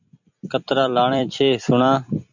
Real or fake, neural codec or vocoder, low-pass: fake; vocoder, 44.1 kHz, 128 mel bands every 256 samples, BigVGAN v2; 7.2 kHz